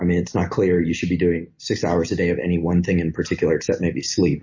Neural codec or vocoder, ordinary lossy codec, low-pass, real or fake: none; MP3, 32 kbps; 7.2 kHz; real